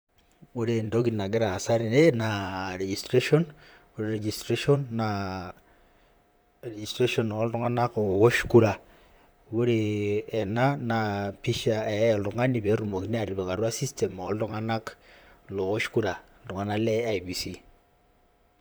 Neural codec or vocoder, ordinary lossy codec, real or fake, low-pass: vocoder, 44.1 kHz, 128 mel bands, Pupu-Vocoder; none; fake; none